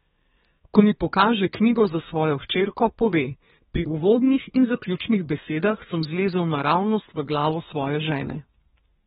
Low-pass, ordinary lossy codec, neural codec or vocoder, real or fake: 14.4 kHz; AAC, 16 kbps; codec, 32 kHz, 1.9 kbps, SNAC; fake